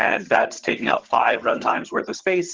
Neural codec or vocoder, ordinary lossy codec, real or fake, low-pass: vocoder, 22.05 kHz, 80 mel bands, HiFi-GAN; Opus, 16 kbps; fake; 7.2 kHz